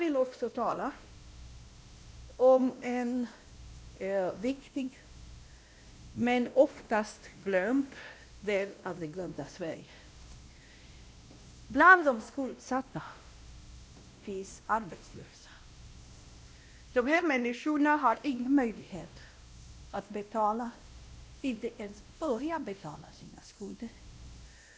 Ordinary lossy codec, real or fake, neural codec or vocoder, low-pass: none; fake; codec, 16 kHz, 1 kbps, X-Codec, WavLM features, trained on Multilingual LibriSpeech; none